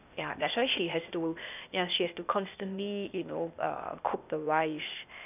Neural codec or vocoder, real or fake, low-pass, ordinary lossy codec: codec, 16 kHz, 0.8 kbps, ZipCodec; fake; 3.6 kHz; none